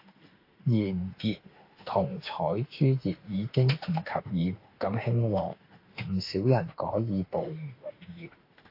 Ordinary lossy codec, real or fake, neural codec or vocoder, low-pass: AAC, 32 kbps; fake; autoencoder, 48 kHz, 32 numbers a frame, DAC-VAE, trained on Japanese speech; 5.4 kHz